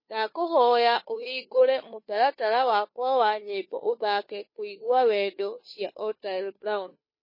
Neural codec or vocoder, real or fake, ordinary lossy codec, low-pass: codec, 16 kHz, 2 kbps, FunCodec, trained on Chinese and English, 25 frames a second; fake; MP3, 24 kbps; 5.4 kHz